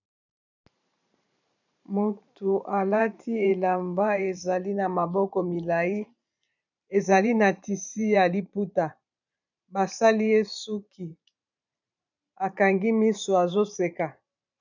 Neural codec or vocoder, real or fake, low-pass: vocoder, 44.1 kHz, 128 mel bands every 512 samples, BigVGAN v2; fake; 7.2 kHz